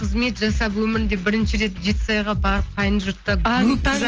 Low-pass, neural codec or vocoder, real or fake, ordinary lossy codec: 7.2 kHz; none; real; Opus, 16 kbps